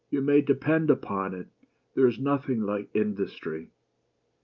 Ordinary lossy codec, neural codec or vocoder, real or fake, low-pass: Opus, 24 kbps; none; real; 7.2 kHz